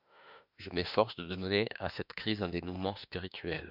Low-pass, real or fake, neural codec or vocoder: 5.4 kHz; fake; autoencoder, 48 kHz, 32 numbers a frame, DAC-VAE, trained on Japanese speech